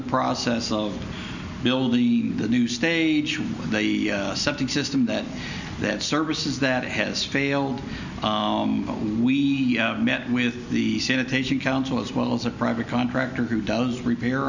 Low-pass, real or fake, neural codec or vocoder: 7.2 kHz; real; none